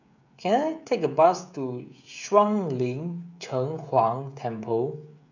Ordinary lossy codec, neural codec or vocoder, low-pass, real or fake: none; codec, 16 kHz, 16 kbps, FreqCodec, smaller model; 7.2 kHz; fake